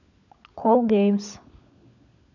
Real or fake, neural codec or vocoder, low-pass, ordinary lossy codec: fake; codec, 16 kHz, 16 kbps, FunCodec, trained on LibriTTS, 50 frames a second; 7.2 kHz; none